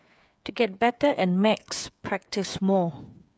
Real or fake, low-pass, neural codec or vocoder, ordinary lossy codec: fake; none; codec, 16 kHz, 8 kbps, FreqCodec, smaller model; none